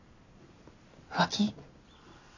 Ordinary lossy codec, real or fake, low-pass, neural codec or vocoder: none; real; 7.2 kHz; none